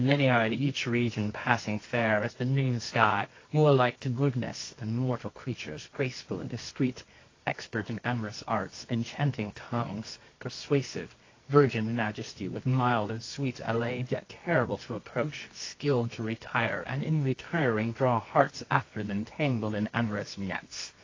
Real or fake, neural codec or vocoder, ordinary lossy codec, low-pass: fake; codec, 24 kHz, 0.9 kbps, WavTokenizer, medium music audio release; AAC, 32 kbps; 7.2 kHz